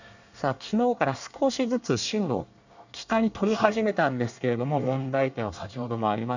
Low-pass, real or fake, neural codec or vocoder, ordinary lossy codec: 7.2 kHz; fake; codec, 24 kHz, 1 kbps, SNAC; none